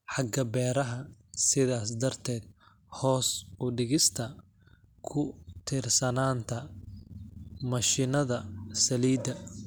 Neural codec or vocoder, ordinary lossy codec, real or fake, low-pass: none; none; real; none